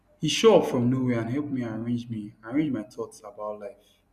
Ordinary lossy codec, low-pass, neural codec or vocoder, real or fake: none; 14.4 kHz; none; real